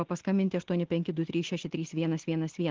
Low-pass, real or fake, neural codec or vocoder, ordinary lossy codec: 7.2 kHz; real; none; Opus, 16 kbps